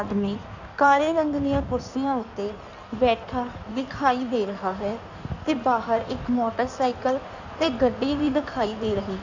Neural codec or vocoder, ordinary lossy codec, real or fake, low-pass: codec, 16 kHz in and 24 kHz out, 1.1 kbps, FireRedTTS-2 codec; none; fake; 7.2 kHz